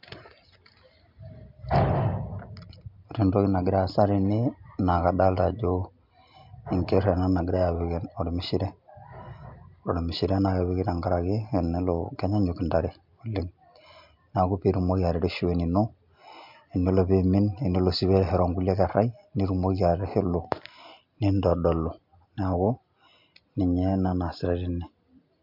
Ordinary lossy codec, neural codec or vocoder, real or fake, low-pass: MP3, 48 kbps; none; real; 5.4 kHz